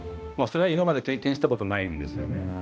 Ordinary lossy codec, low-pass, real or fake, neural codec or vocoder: none; none; fake; codec, 16 kHz, 1 kbps, X-Codec, HuBERT features, trained on balanced general audio